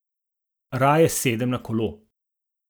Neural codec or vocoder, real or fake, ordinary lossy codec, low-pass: none; real; none; none